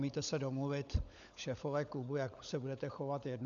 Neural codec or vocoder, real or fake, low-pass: codec, 16 kHz, 16 kbps, FunCodec, trained on LibriTTS, 50 frames a second; fake; 7.2 kHz